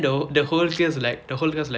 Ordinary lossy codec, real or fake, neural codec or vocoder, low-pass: none; real; none; none